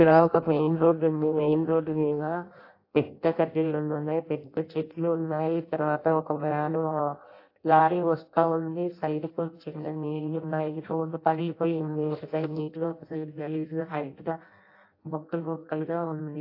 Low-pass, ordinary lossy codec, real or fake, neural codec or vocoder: 5.4 kHz; AAC, 32 kbps; fake; codec, 16 kHz in and 24 kHz out, 0.6 kbps, FireRedTTS-2 codec